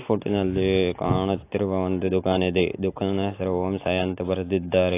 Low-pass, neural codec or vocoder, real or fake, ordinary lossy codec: 3.6 kHz; none; real; AAC, 24 kbps